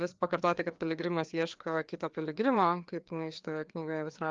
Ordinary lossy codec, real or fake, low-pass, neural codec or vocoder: Opus, 32 kbps; fake; 7.2 kHz; codec, 16 kHz, 2 kbps, FreqCodec, larger model